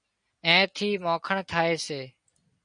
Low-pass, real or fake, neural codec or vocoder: 9.9 kHz; real; none